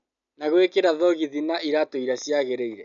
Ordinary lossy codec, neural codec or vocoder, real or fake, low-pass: none; none; real; 7.2 kHz